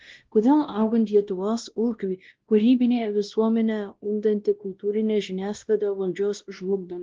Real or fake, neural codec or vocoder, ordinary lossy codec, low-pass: fake; codec, 16 kHz, 1 kbps, X-Codec, WavLM features, trained on Multilingual LibriSpeech; Opus, 16 kbps; 7.2 kHz